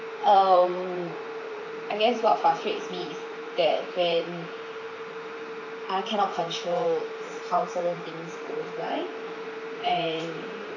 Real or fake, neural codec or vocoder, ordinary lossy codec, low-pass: fake; vocoder, 44.1 kHz, 128 mel bands, Pupu-Vocoder; none; 7.2 kHz